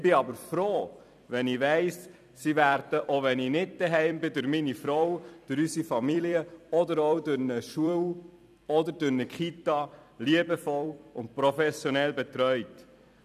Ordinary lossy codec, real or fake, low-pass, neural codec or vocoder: none; fake; 14.4 kHz; vocoder, 44.1 kHz, 128 mel bands every 256 samples, BigVGAN v2